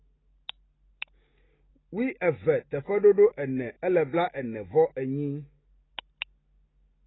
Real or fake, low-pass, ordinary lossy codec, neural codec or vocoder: real; 7.2 kHz; AAC, 16 kbps; none